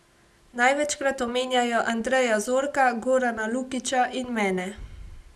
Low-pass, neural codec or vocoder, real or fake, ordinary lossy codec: none; none; real; none